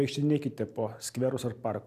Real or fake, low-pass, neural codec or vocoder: real; 14.4 kHz; none